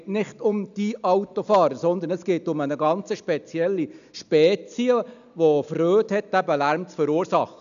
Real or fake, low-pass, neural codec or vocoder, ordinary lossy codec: real; 7.2 kHz; none; none